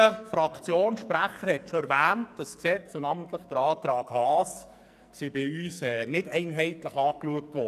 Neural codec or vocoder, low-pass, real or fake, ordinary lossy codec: codec, 44.1 kHz, 2.6 kbps, SNAC; 14.4 kHz; fake; none